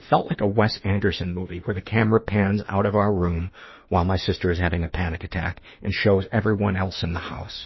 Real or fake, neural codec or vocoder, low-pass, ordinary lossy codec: fake; autoencoder, 48 kHz, 32 numbers a frame, DAC-VAE, trained on Japanese speech; 7.2 kHz; MP3, 24 kbps